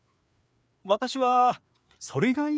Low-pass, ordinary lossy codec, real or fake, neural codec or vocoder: none; none; fake; codec, 16 kHz, 4 kbps, FreqCodec, larger model